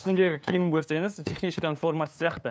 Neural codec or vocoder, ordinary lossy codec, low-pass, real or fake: codec, 16 kHz, 2 kbps, FunCodec, trained on LibriTTS, 25 frames a second; none; none; fake